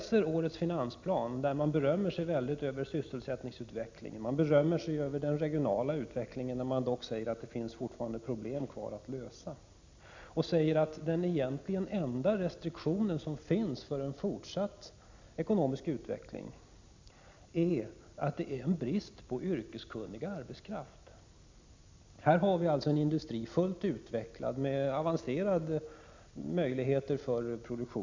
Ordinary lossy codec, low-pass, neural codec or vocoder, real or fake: MP3, 64 kbps; 7.2 kHz; none; real